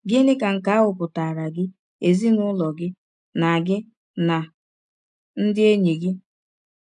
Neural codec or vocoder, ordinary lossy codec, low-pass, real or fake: none; none; 10.8 kHz; real